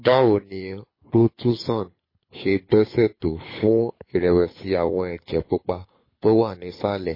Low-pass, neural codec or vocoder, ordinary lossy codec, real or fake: 5.4 kHz; codec, 24 kHz, 6 kbps, HILCodec; MP3, 24 kbps; fake